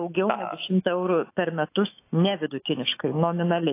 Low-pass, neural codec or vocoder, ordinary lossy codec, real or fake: 3.6 kHz; autoencoder, 48 kHz, 128 numbers a frame, DAC-VAE, trained on Japanese speech; AAC, 24 kbps; fake